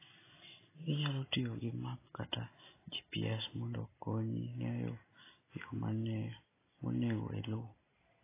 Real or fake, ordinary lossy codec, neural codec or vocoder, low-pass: real; AAC, 16 kbps; none; 3.6 kHz